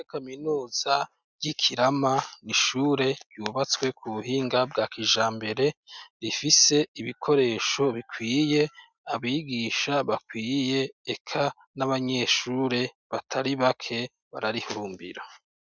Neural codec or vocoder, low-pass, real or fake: none; 7.2 kHz; real